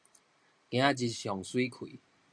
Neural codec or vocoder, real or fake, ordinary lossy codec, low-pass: none; real; MP3, 64 kbps; 9.9 kHz